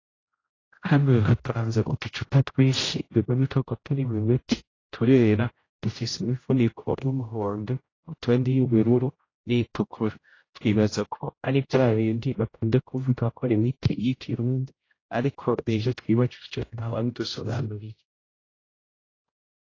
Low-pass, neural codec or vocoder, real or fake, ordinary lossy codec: 7.2 kHz; codec, 16 kHz, 0.5 kbps, X-Codec, HuBERT features, trained on general audio; fake; AAC, 32 kbps